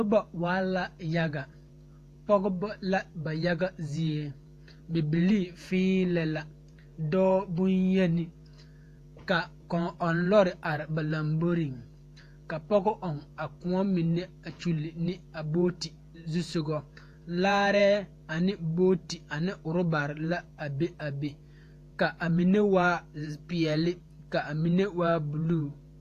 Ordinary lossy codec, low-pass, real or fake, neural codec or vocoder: AAC, 64 kbps; 14.4 kHz; fake; vocoder, 48 kHz, 128 mel bands, Vocos